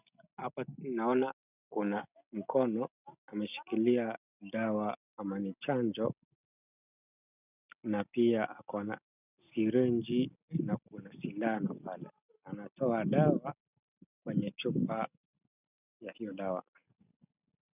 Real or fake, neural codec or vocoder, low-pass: real; none; 3.6 kHz